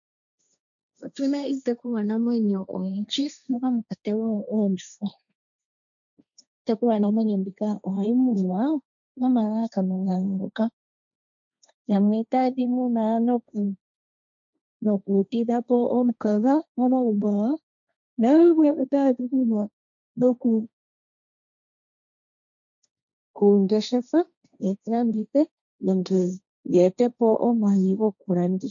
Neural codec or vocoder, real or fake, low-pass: codec, 16 kHz, 1.1 kbps, Voila-Tokenizer; fake; 7.2 kHz